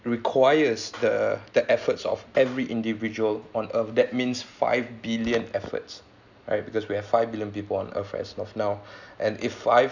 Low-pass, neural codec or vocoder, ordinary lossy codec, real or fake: 7.2 kHz; none; none; real